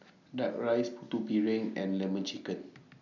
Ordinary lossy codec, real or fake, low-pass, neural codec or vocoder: none; real; 7.2 kHz; none